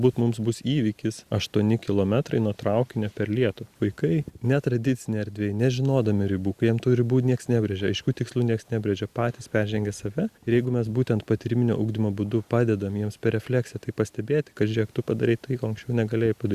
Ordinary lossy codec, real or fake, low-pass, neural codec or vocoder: Opus, 64 kbps; real; 14.4 kHz; none